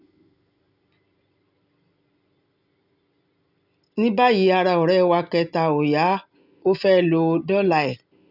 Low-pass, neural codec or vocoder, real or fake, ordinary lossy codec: 5.4 kHz; none; real; none